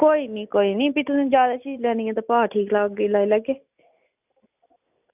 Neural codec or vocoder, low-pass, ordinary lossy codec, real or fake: none; 3.6 kHz; none; real